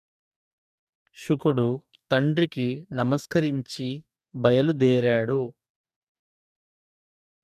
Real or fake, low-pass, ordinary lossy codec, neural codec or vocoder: fake; 14.4 kHz; none; codec, 44.1 kHz, 2.6 kbps, DAC